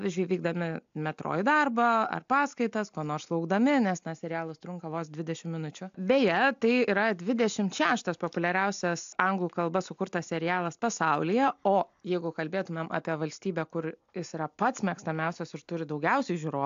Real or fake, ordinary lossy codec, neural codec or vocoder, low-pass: real; AAC, 64 kbps; none; 7.2 kHz